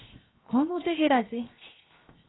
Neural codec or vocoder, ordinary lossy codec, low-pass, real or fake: codec, 16 kHz, 0.7 kbps, FocalCodec; AAC, 16 kbps; 7.2 kHz; fake